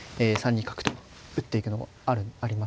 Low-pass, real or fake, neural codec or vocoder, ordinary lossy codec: none; real; none; none